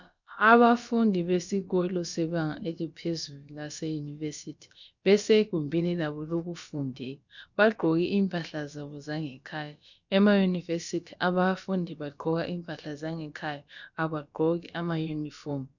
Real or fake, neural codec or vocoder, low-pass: fake; codec, 16 kHz, about 1 kbps, DyCAST, with the encoder's durations; 7.2 kHz